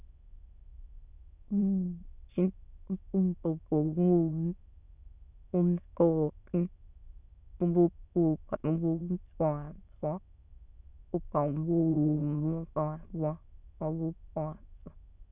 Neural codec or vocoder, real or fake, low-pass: autoencoder, 22.05 kHz, a latent of 192 numbers a frame, VITS, trained on many speakers; fake; 3.6 kHz